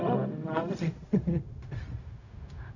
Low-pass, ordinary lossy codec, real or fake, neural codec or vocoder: 7.2 kHz; none; fake; codec, 16 kHz, 0.4 kbps, LongCat-Audio-Codec